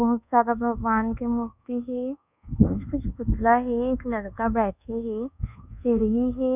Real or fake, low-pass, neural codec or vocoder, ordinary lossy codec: fake; 3.6 kHz; codec, 24 kHz, 1.2 kbps, DualCodec; none